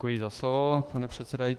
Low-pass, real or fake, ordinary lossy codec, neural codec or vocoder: 14.4 kHz; fake; Opus, 24 kbps; autoencoder, 48 kHz, 32 numbers a frame, DAC-VAE, trained on Japanese speech